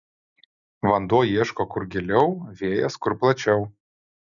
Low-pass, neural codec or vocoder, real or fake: 7.2 kHz; none; real